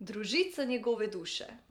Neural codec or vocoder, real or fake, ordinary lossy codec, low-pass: vocoder, 44.1 kHz, 128 mel bands every 512 samples, BigVGAN v2; fake; none; 19.8 kHz